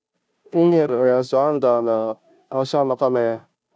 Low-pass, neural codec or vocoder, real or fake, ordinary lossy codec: none; codec, 16 kHz, 0.5 kbps, FunCodec, trained on Chinese and English, 25 frames a second; fake; none